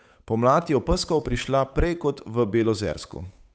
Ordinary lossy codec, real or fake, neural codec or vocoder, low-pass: none; fake; codec, 16 kHz, 8 kbps, FunCodec, trained on Chinese and English, 25 frames a second; none